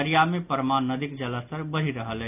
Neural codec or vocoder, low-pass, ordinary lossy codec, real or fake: none; 3.6 kHz; none; real